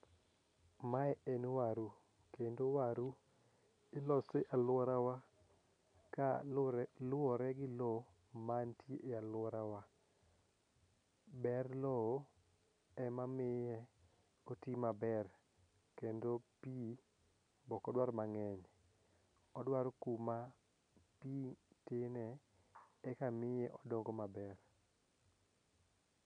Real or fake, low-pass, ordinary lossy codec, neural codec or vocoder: real; 9.9 kHz; none; none